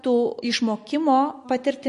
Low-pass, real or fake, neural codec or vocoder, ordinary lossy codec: 14.4 kHz; real; none; MP3, 48 kbps